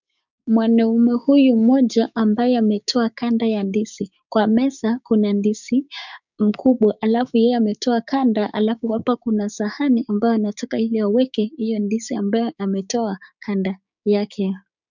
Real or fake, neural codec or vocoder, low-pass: fake; codec, 16 kHz, 6 kbps, DAC; 7.2 kHz